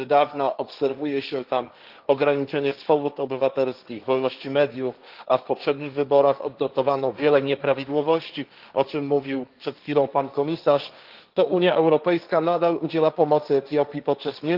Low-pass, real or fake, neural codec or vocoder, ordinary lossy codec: 5.4 kHz; fake; codec, 16 kHz, 1.1 kbps, Voila-Tokenizer; Opus, 32 kbps